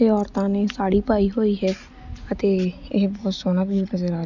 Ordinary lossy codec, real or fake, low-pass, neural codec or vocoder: none; real; 7.2 kHz; none